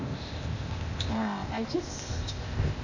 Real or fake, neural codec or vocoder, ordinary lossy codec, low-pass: fake; codec, 16 kHz, 0.8 kbps, ZipCodec; none; 7.2 kHz